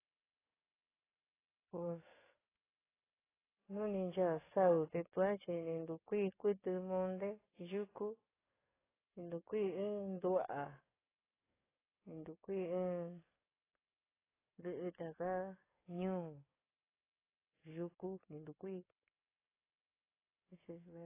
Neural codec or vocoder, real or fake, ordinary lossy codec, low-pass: codec, 44.1 kHz, 7.8 kbps, DAC; fake; AAC, 16 kbps; 3.6 kHz